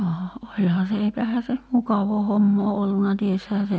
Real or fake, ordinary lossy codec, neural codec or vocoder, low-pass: real; none; none; none